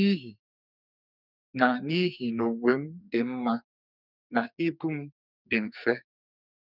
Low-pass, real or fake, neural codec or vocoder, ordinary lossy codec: 5.4 kHz; fake; codec, 44.1 kHz, 2.6 kbps, SNAC; none